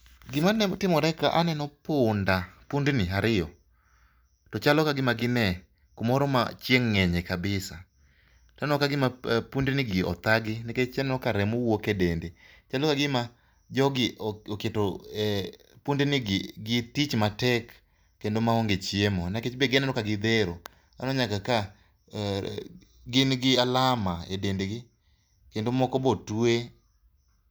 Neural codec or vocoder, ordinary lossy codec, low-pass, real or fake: none; none; none; real